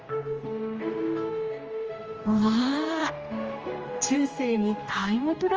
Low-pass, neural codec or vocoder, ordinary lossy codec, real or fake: 7.2 kHz; codec, 16 kHz, 1 kbps, X-Codec, HuBERT features, trained on general audio; Opus, 24 kbps; fake